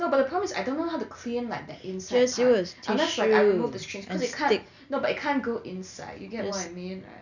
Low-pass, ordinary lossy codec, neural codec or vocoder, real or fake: 7.2 kHz; none; none; real